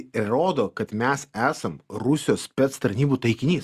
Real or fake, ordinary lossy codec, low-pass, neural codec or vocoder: real; Opus, 64 kbps; 14.4 kHz; none